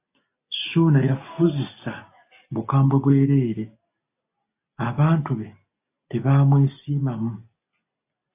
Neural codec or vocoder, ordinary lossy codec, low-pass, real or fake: none; AAC, 24 kbps; 3.6 kHz; real